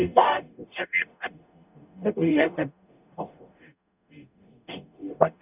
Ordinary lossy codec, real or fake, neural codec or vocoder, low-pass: none; fake; codec, 44.1 kHz, 0.9 kbps, DAC; 3.6 kHz